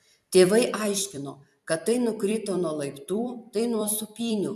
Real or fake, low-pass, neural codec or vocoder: fake; 14.4 kHz; vocoder, 44.1 kHz, 128 mel bands every 256 samples, BigVGAN v2